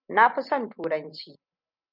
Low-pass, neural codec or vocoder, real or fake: 5.4 kHz; none; real